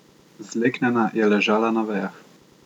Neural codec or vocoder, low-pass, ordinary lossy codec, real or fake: none; 19.8 kHz; none; real